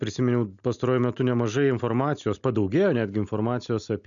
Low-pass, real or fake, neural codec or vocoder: 7.2 kHz; real; none